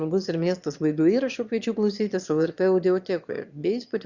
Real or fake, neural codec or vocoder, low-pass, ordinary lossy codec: fake; autoencoder, 22.05 kHz, a latent of 192 numbers a frame, VITS, trained on one speaker; 7.2 kHz; Opus, 64 kbps